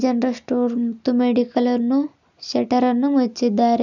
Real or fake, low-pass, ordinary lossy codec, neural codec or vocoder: real; 7.2 kHz; none; none